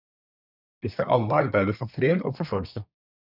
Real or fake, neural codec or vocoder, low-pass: fake; codec, 24 kHz, 1 kbps, SNAC; 5.4 kHz